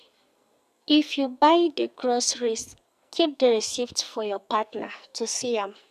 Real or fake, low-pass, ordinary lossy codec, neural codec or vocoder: fake; 14.4 kHz; none; codec, 44.1 kHz, 2.6 kbps, SNAC